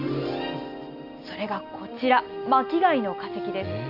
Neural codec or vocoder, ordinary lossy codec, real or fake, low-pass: none; none; real; 5.4 kHz